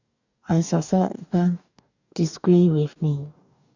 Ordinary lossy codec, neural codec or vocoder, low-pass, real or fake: none; codec, 44.1 kHz, 2.6 kbps, DAC; 7.2 kHz; fake